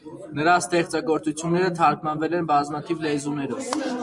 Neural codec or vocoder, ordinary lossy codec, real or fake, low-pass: none; MP3, 96 kbps; real; 10.8 kHz